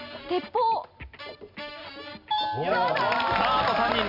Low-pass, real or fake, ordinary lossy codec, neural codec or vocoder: 5.4 kHz; real; none; none